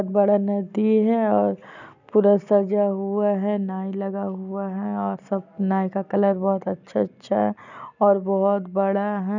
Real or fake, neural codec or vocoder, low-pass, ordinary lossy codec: real; none; 7.2 kHz; none